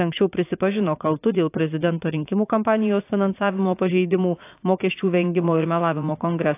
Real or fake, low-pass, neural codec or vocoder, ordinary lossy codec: fake; 3.6 kHz; vocoder, 44.1 kHz, 80 mel bands, Vocos; AAC, 24 kbps